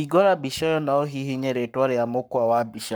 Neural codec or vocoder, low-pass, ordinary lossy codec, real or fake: codec, 44.1 kHz, 7.8 kbps, Pupu-Codec; none; none; fake